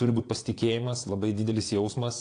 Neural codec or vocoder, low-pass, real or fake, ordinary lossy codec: vocoder, 22.05 kHz, 80 mel bands, Vocos; 9.9 kHz; fake; AAC, 48 kbps